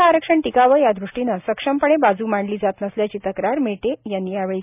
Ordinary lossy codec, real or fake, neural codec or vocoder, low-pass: none; real; none; 3.6 kHz